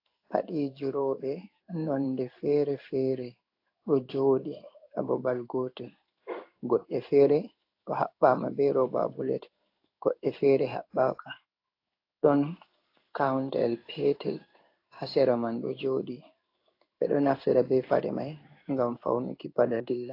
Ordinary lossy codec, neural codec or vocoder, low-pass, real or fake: AAC, 32 kbps; codec, 16 kHz in and 24 kHz out, 1 kbps, XY-Tokenizer; 5.4 kHz; fake